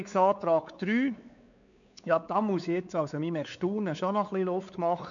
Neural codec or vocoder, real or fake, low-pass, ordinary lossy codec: codec, 16 kHz, 4 kbps, X-Codec, WavLM features, trained on Multilingual LibriSpeech; fake; 7.2 kHz; MP3, 96 kbps